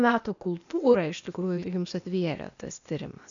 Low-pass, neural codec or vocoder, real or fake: 7.2 kHz; codec, 16 kHz, 0.8 kbps, ZipCodec; fake